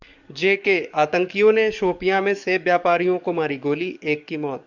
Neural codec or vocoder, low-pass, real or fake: codec, 44.1 kHz, 7.8 kbps, DAC; 7.2 kHz; fake